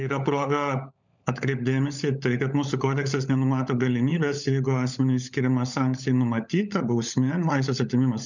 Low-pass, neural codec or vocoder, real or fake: 7.2 kHz; codec, 16 kHz, 16 kbps, FunCodec, trained on LibriTTS, 50 frames a second; fake